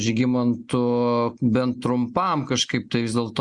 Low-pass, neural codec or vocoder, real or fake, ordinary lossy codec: 10.8 kHz; none; real; Opus, 64 kbps